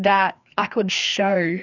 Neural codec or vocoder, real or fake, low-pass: codec, 16 kHz, 2 kbps, FreqCodec, larger model; fake; 7.2 kHz